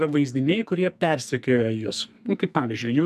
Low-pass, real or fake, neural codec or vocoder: 14.4 kHz; fake; codec, 44.1 kHz, 2.6 kbps, SNAC